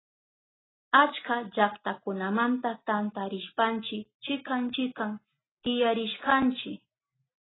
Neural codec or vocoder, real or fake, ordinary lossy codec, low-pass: none; real; AAC, 16 kbps; 7.2 kHz